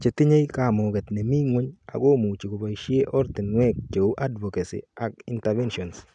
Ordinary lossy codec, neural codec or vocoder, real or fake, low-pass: none; none; real; 9.9 kHz